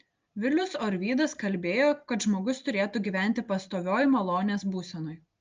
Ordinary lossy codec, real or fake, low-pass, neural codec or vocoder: Opus, 24 kbps; real; 7.2 kHz; none